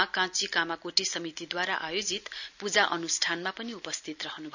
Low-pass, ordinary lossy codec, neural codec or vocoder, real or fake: 7.2 kHz; none; none; real